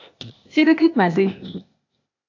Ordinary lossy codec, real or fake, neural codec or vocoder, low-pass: AAC, 48 kbps; fake; codec, 16 kHz, 0.8 kbps, ZipCodec; 7.2 kHz